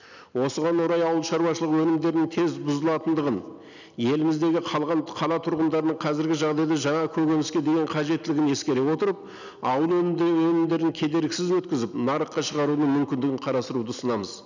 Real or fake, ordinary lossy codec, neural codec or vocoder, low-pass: real; none; none; 7.2 kHz